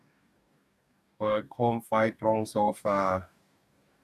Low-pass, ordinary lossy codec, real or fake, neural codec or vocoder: 14.4 kHz; none; fake; codec, 44.1 kHz, 2.6 kbps, DAC